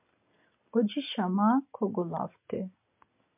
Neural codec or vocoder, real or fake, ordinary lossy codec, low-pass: none; real; MP3, 32 kbps; 3.6 kHz